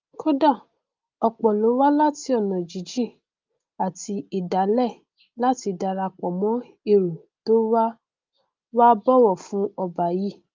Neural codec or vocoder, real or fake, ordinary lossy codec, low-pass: none; real; Opus, 24 kbps; 7.2 kHz